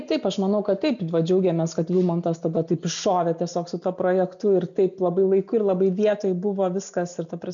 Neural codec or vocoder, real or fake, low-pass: none; real; 7.2 kHz